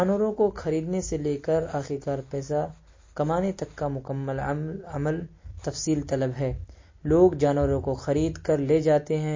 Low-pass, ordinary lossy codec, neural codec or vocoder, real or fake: 7.2 kHz; MP3, 32 kbps; none; real